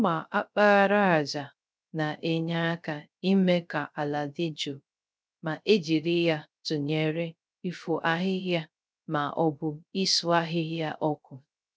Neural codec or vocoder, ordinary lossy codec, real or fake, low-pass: codec, 16 kHz, 0.3 kbps, FocalCodec; none; fake; none